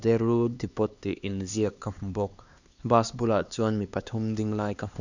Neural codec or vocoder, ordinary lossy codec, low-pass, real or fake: codec, 16 kHz, 2 kbps, X-Codec, HuBERT features, trained on LibriSpeech; none; 7.2 kHz; fake